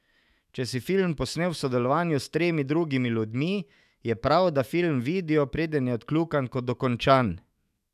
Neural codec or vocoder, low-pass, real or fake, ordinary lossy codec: autoencoder, 48 kHz, 128 numbers a frame, DAC-VAE, trained on Japanese speech; 14.4 kHz; fake; none